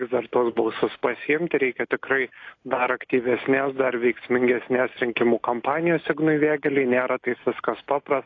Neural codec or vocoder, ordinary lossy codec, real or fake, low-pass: none; AAC, 32 kbps; real; 7.2 kHz